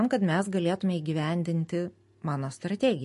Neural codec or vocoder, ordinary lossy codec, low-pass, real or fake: none; MP3, 48 kbps; 14.4 kHz; real